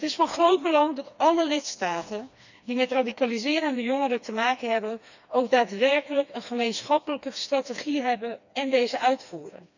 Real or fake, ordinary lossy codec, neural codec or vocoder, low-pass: fake; none; codec, 16 kHz, 2 kbps, FreqCodec, smaller model; 7.2 kHz